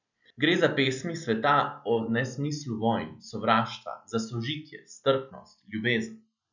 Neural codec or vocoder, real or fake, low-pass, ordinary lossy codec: none; real; 7.2 kHz; none